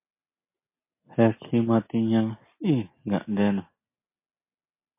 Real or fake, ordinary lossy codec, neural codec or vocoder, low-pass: real; MP3, 24 kbps; none; 3.6 kHz